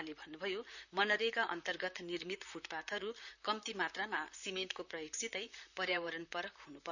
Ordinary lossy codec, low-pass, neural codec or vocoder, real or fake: none; 7.2 kHz; vocoder, 44.1 kHz, 128 mel bands, Pupu-Vocoder; fake